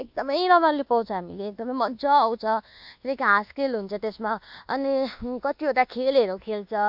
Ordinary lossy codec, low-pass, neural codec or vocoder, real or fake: MP3, 48 kbps; 5.4 kHz; codec, 24 kHz, 1.2 kbps, DualCodec; fake